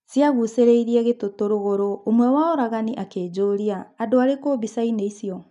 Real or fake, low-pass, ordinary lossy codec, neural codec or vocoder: real; 10.8 kHz; none; none